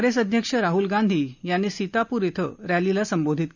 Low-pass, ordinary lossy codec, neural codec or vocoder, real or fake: 7.2 kHz; none; none; real